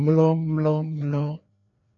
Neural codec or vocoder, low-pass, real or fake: codec, 16 kHz, 2 kbps, FreqCodec, larger model; 7.2 kHz; fake